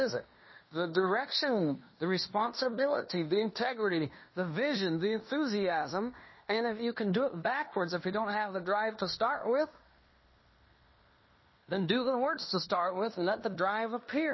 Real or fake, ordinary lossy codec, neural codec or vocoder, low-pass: fake; MP3, 24 kbps; codec, 16 kHz in and 24 kHz out, 0.9 kbps, LongCat-Audio-Codec, fine tuned four codebook decoder; 7.2 kHz